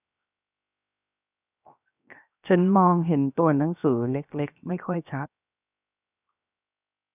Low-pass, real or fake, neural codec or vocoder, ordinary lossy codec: 3.6 kHz; fake; codec, 16 kHz, 0.7 kbps, FocalCodec; none